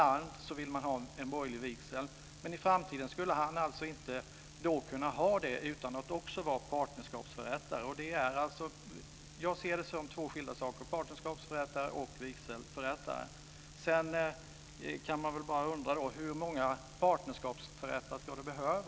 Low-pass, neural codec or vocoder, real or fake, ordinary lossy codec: none; none; real; none